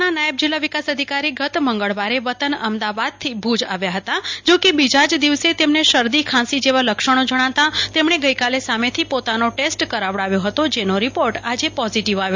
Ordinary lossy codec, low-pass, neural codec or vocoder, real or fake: MP3, 64 kbps; 7.2 kHz; none; real